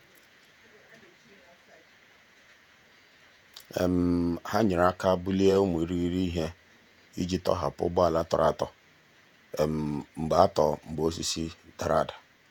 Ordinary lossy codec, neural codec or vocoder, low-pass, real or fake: none; vocoder, 48 kHz, 128 mel bands, Vocos; none; fake